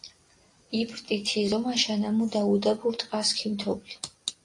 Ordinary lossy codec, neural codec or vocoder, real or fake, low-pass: AAC, 48 kbps; none; real; 10.8 kHz